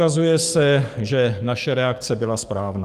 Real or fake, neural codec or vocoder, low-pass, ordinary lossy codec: fake; codec, 44.1 kHz, 7.8 kbps, DAC; 14.4 kHz; Opus, 32 kbps